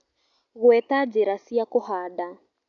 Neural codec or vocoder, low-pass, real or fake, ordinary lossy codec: none; 7.2 kHz; real; none